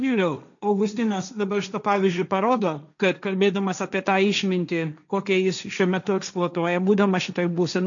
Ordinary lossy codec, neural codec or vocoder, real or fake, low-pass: MP3, 96 kbps; codec, 16 kHz, 1.1 kbps, Voila-Tokenizer; fake; 7.2 kHz